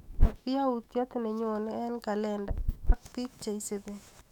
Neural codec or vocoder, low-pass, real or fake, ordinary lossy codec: autoencoder, 48 kHz, 128 numbers a frame, DAC-VAE, trained on Japanese speech; 19.8 kHz; fake; none